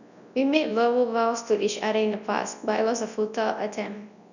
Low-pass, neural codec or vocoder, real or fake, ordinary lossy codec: 7.2 kHz; codec, 24 kHz, 0.9 kbps, WavTokenizer, large speech release; fake; none